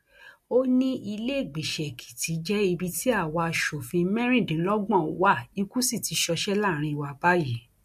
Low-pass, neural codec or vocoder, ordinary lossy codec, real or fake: 14.4 kHz; none; MP3, 64 kbps; real